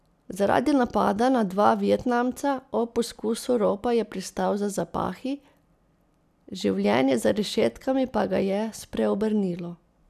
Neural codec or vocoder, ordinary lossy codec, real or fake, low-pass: none; none; real; 14.4 kHz